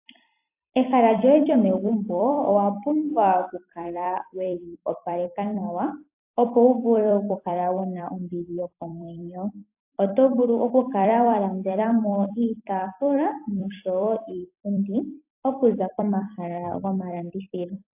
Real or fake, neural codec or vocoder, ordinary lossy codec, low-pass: fake; vocoder, 44.1 kHz, 128 mel bands every 256 samples, BigVGAN v2; AAC, 32 kbps; 3.6 kHz